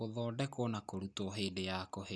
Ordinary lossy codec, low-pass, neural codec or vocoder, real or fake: none; none; none; real